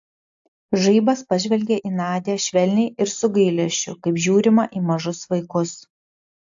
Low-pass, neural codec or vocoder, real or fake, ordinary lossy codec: 7.2 kHz; none; real; AAC, 64 kbps